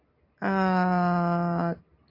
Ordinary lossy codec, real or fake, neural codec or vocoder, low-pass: AAC, 32 kbps; real; none; 5.4 kHz